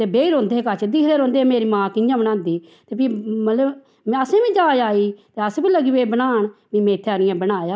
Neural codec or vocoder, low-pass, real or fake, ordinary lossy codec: none; none; real; none